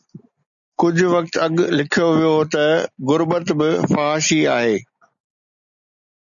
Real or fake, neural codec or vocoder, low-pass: real; none; 7.2 kHz